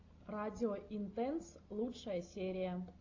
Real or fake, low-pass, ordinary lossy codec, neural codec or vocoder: real; 7.2 kHz; AAC, 48 kbps; none